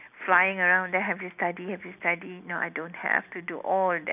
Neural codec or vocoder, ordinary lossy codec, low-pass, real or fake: none; none; 3.6 kHz; real